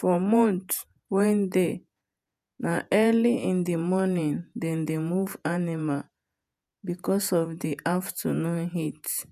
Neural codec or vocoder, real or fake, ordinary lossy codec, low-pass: vocoder, 48 kHz, 128 mel bands, Vocos; fake; none; 14.4 kHz